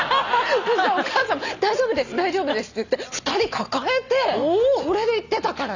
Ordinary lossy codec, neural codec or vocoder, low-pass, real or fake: none; none; 7.2 kHz; real